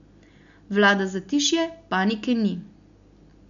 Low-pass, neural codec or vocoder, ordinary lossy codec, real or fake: 7.2 kHz; none; none; real